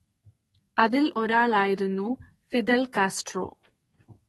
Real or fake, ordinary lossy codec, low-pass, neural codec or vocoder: fake; AAC, 32 kbps; 14.4 kHz; codec, 32 kHz, 1.9 kbps, SNAC